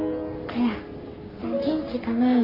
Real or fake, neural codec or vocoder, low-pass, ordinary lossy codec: fake; codec, 44.1 kHz, 3.4 kbps, Pupu-Codec; 5.4 kHz; none